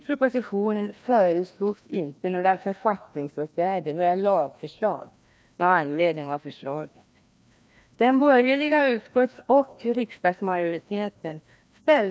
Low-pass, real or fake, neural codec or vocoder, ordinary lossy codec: none; fake; codec, 16 kHz, 1 kbps, FreqCodec, larger model; none